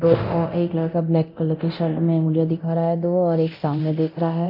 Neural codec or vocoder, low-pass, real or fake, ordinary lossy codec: codec, 24 kHz, 0.9 kbps, DualCodec; 5.4 kHz; fake; none